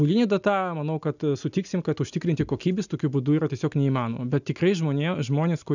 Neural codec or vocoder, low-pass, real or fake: none; 7.2 kHz; real